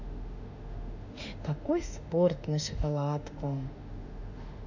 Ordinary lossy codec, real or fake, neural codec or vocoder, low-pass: none; fake; autoencoder, 48 kHz, 32 numbers a frame, DAC-VAE, trained on Japanese speech; 7.2 kHz